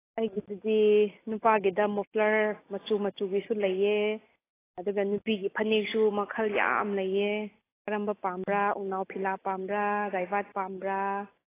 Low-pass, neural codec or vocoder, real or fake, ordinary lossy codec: 3.6 kHz; none; real; AAC, 16 kbps